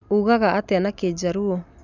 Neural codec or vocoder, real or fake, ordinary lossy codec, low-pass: none; real; none; 7.2 kHz